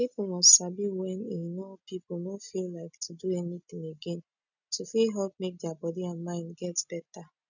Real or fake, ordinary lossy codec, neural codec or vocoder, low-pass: real; none; none; 7.2 kHz